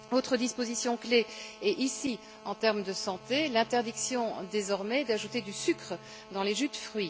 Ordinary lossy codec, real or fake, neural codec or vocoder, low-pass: none; real; none; none